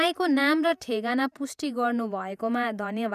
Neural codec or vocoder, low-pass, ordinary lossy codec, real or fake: vocoder, 48 kHz, 128 mel bands, Vocos; 14.4 kHz; none; fake